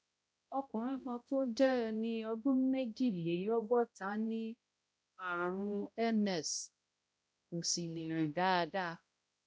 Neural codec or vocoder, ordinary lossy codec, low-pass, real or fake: codec, 16 kHz, 0.5 kbps, X-Codec, HuBERT features, trained on balanced general audio; none; none; fake